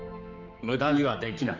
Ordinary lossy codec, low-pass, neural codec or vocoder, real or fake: none; 7.2 kHz; codec, 16 kHz, 4 kbps, X-Codec, HuBERT features, trained on general audio; fake